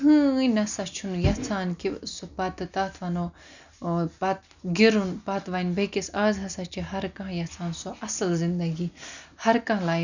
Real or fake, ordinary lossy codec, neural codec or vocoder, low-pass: real; none; none; 7.2 kHz